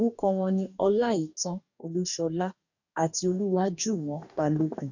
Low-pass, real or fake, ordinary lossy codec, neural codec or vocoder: 7.2 kHz; fake; none; codec, 44.1 kHz, 2.6 kbps, DAC